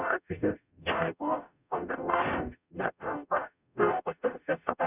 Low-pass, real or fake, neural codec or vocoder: 3.6 kHz; fake; codec, 44.1 kHz, 0.9 kbps, DAC